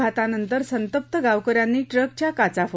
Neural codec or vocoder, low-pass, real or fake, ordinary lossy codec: none; none; real; none